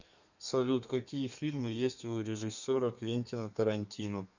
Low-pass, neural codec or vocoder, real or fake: 7.2 kHz; codec, 32 kHz, 1.9 kbps, SNAC; fake